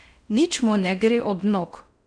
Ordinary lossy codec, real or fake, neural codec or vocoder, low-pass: none; fake; codec, 16 kHz in and 24 kHz out, 0.6 kbps, FocalCodec, streaming, 4096 codes; 9.9 kHz